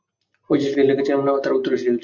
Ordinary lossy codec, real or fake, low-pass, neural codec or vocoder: MP3, 48 kbps; real; 7.2 kHz; none